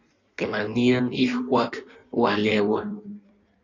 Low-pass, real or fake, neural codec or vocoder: 7.2 kHz; fake; codec, 16 kHz in and 24 kHz out, 1.1 kbps, FireRedTTS-2 codec